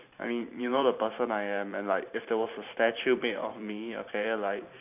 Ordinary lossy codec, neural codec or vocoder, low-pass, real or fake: none; vocoder, 44.1 kHz, 128 mel bands every 512 samples, BigVGAN v2; 3.6 kHz; fake